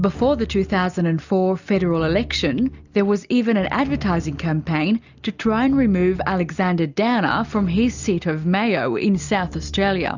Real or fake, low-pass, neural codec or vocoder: real; 7.2 kHz; none